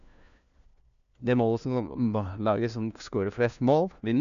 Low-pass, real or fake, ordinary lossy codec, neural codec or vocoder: 7.2 kHz; fake; none; codec, 16 kHz, 1 kbps, FunCodec, trained on LibriTTS, 50 frames a second